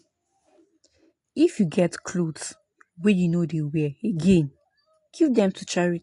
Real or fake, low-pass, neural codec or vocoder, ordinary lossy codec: real; 10.8 kHz; none; AAC, 48 kbps